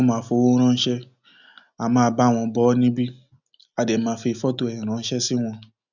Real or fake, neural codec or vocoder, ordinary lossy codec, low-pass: real; none; none; 7.2 kHz